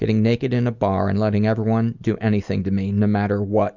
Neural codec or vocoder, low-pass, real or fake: none; 7.2 kHz; real